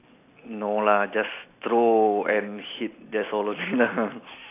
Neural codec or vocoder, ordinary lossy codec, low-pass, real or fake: none; AAC, 24 kbps; 3.6 kHz; real